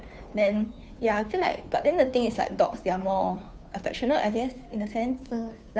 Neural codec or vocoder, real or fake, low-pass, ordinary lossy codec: codec, 16 kHz, 2 kbps, FunCodec, trained on Chinese and English, 25 frames a second; fake; none; none